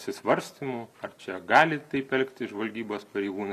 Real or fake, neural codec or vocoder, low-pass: real; none; 14.4 kHz